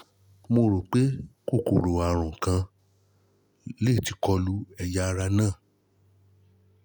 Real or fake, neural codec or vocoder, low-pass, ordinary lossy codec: real; none; none; none